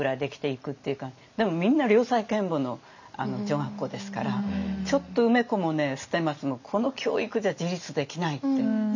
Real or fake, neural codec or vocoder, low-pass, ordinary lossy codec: real; none; 7.2 kHz; none